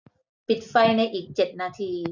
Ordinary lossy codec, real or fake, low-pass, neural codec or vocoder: none; real; 7.2 kHz; none